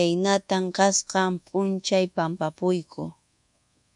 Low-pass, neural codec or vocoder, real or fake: 10.8 kHz; codec, 24 kHz, 1.2 kbps, DualCodec; fake